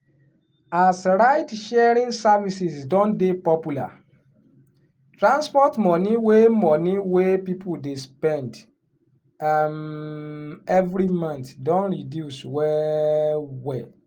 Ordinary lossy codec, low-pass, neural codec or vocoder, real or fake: Opus, 24 kbps; 19.8 kHz; none; real